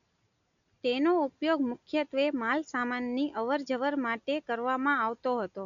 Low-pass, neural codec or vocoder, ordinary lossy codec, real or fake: 7.2 kHz; none; MP3, 96 kbps; real